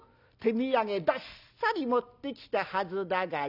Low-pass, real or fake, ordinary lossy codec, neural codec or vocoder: 5.4 kHz; real; none; none